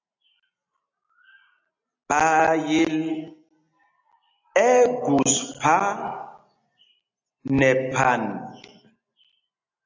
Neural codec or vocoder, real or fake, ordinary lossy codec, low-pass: none; real; AAC, 48 kbps; 7.2 kHz